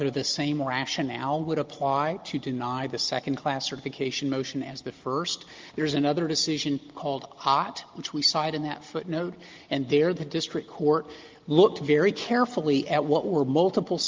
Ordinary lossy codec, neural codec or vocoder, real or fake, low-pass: Opus, 32 kbps; none; real; 7.2 kHz